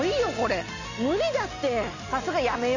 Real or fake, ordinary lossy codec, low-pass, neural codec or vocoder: real; none; 7.2 kHz; none